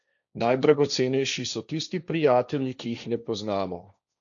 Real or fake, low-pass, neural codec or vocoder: fake; 7.2 kHz; codec, 16 kHz, 1.1 kbps, Voila-Tokenizer